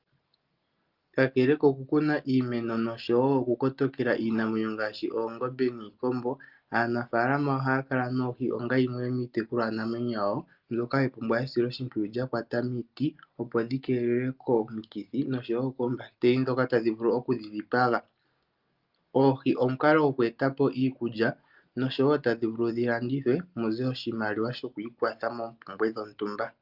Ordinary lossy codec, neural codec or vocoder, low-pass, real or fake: Opus, 24 kbps; none; 5.4 kHz; real